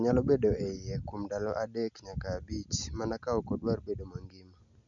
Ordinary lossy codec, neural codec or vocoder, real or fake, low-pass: none; none; real; 7.2 kHz